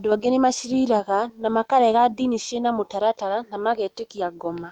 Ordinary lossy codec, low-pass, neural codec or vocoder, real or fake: Opus, 64 kbps; 19.8 kHz; autoencoder, 48 kHz, 128 numbers a frame, DAC-VAE, trained on Japanese speech; fake